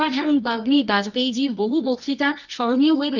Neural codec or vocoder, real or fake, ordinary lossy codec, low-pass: codec, 24 kHz, 0.9 kbps, WavTokenizer, medium music audio release; fake; none; 7.2 kHz